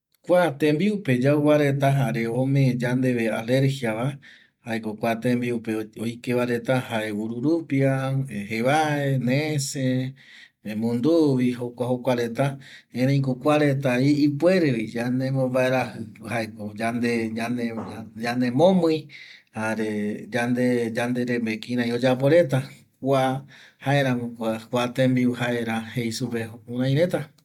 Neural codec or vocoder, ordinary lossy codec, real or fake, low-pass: none; MP3, 96 kbps; real; 19.8 kHz